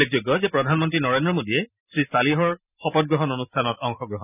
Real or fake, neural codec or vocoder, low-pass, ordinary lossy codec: real; none; 3.6 kHz; none